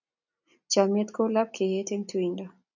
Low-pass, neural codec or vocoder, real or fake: 7.2 kHz; none; real